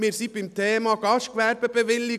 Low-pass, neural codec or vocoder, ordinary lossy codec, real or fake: 14.4 kHz; none; none; real